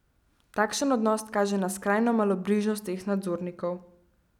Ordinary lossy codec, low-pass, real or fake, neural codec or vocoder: none; 19.8 kHz; real; none